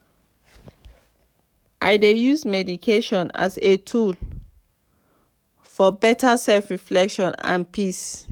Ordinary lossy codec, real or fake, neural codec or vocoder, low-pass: none; fake; codec, 44.1 kHz, 7.8 kbps, DAC; 19.8 kHz